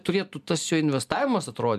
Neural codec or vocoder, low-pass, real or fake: none; 14.4 kHz; real